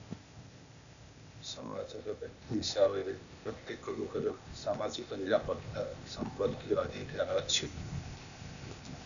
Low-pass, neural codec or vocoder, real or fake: 7.2 kHz; codec, 16 kHz, 0.8 kbps, ZipCodec; fake